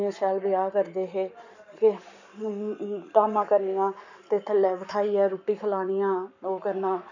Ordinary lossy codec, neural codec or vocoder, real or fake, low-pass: none; codec, 44.1 kHz, 7.8 kbps, Pupu-Codec; fake; 7.2 kHz